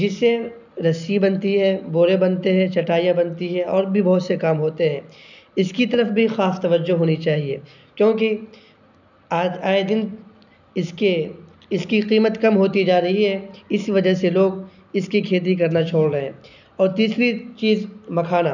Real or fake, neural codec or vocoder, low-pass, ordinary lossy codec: real; none; 7.2 kHz; none